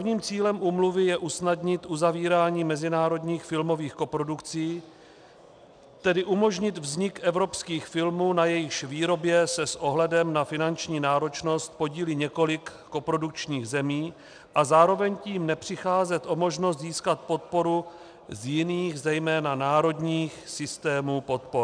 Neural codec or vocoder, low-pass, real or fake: none; 9.9 kHz; real